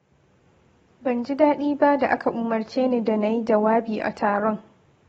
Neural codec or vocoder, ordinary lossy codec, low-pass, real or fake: none; AAC, 24 kbps; 19.8 kHz; real